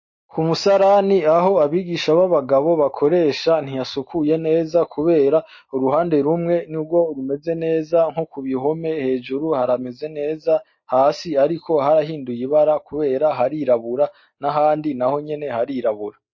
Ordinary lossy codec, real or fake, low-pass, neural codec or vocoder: MP3, 32 kbps; real; 7.2 kHz; none